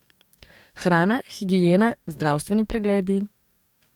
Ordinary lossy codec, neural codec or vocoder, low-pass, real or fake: none; codec, 44.1 kHz, 2.6 kbps, DAC; 19.8 kHz; fake